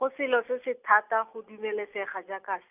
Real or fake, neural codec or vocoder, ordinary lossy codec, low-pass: real; none; none; 3.6 kHz